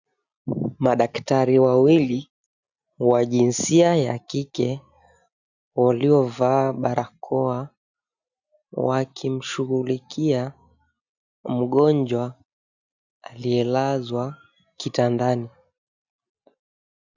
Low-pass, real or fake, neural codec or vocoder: 7.2 kHz; real; none